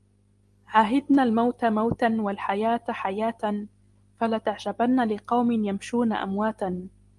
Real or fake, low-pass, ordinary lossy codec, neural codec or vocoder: real; 10.8 kHz; Opus, 32 kbps; none